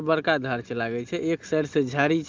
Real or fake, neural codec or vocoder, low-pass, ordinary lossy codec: real; none; 7.2 kHz; Opus, 32 kbps